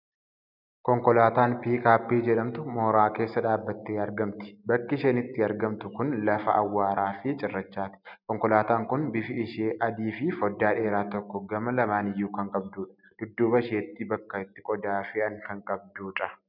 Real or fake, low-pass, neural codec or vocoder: real; 5.4 kHz; none